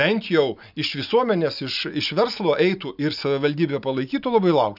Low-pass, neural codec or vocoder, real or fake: 5.4 kHz; none; real